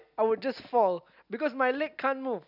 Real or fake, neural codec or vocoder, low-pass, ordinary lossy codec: real; none; 5.4 kHz; none